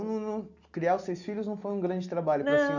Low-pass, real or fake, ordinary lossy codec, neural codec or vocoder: 7.2 kHz; real; none; none